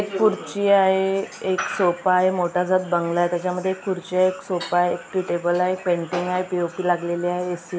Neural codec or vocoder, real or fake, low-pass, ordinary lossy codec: none; real; none; none